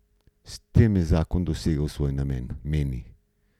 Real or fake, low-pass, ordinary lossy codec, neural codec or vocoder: real; 19.8 kHz; none; none